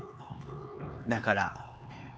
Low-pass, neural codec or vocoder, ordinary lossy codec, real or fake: none; codec, 16 kHz, 4 kbps, X-Codec, HuBERT features, trained on LibriSpeech; none; fake